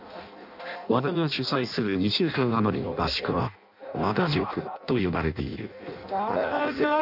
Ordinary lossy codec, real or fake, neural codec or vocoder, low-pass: none; fake; codec, 16 kHz in and 24 kHz out, 0.6 kbps, FireRedTTS-2 codec; 5.4 kHz